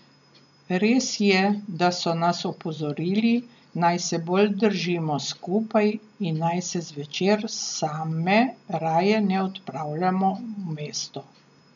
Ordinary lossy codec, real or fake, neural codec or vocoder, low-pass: none; real; none; 7.2 kHz